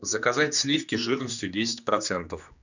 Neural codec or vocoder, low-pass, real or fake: codec, 16 kHz, 2 kbps, X-Codec, HuBERT features, trained on general audio; 7.2 kHz; fake